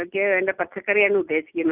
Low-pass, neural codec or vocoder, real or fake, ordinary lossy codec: 3.6 kHz; none; real; none